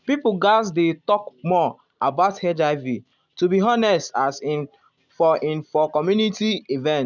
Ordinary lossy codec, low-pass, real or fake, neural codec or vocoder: none; 7.2 kHz; real; none